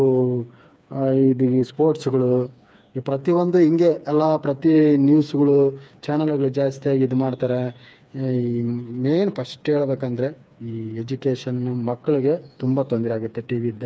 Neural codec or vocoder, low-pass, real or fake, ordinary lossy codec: codec, 16 kHz, 4 kbps, FreqCodec, smaller model; none; fake; none